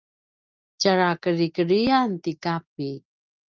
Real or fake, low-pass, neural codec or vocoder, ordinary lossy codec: real; 7.2 kHz; none; Opus, 32 kbps